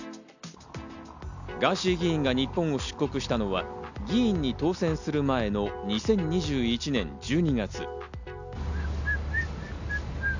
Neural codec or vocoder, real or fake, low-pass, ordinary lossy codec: none; real; 7.2 kHz; none